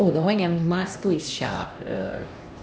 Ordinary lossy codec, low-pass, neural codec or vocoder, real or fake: none; none; codec, 16 kHz, 1 kbps, X-Codec, HuBERT features, trained on LibriSpeech; fake